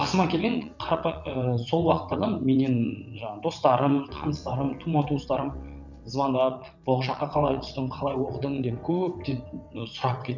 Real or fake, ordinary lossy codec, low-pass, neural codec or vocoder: fake; none; 7.2 kHz; vocoder, 22.05 kHz, 80 mel bands, WaveNeXt